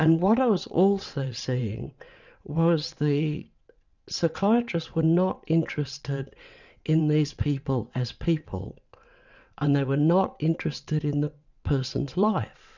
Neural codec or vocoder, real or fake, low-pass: vocoder, 22.05 kHz, 80 mel bands, WaveNeXt; fake; 7.2 kHz